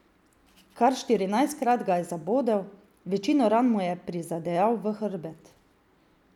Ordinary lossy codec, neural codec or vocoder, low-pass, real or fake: none; none; 19.8 kHz; real